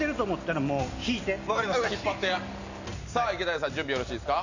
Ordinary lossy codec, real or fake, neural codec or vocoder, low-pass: none; real; none; 7.2 kHz